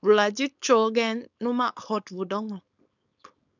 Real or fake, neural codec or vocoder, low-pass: fake; codec, 16 kHz, 4.8 kbps, FACodec; 7.2 kHz